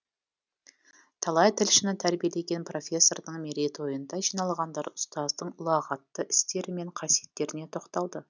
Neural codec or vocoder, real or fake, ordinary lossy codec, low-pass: none; real; none; 7.2 kHz